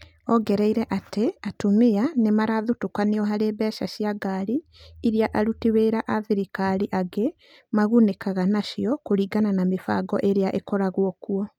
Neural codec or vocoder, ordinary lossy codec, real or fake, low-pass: none; none; real; 19.8 kHz